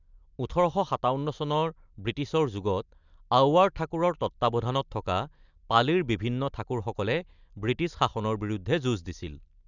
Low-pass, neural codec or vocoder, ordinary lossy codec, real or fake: 7.2 kHz; none; none; real